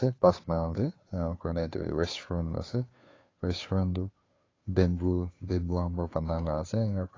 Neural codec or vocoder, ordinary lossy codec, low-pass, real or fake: codec, 16 kHz, 2 kbps, FunCodec, trained on Chinese and English, 25 frames a second; AAC, 32 kbps; 7.2 kHz; fake